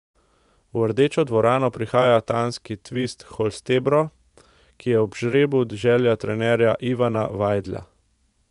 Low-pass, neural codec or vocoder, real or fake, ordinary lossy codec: 10.8 kHz; vocoder, 24 kHz, 100 mel bands, Vocos; fake; none